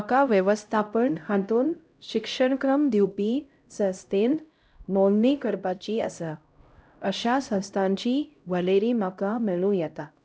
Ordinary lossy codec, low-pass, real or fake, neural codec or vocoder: none; none; fake; codec, 16 kHz, 0.5 kbps, X-Codec, HuBERT features, trained on LibriSpeech